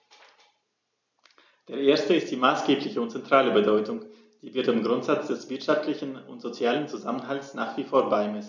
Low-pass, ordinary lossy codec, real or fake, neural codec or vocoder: 7.2 kHz; none; real; none